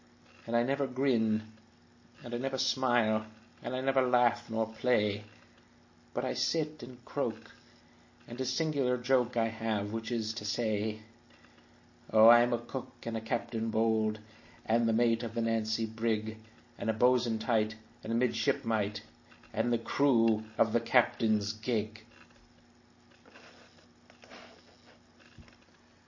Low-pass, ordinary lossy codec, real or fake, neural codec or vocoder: 7.2 kHz; MP3, 32 kbps; real; none